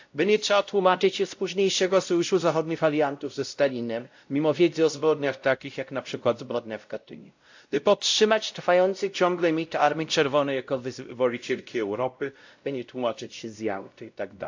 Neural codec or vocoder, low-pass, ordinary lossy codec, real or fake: codec, 16 kHz, 0.5 kbps, X-Codec, WavLM features, trained on Multilingual LibriSpeech; 7.2 kHz; AAC, 48 kbps; fake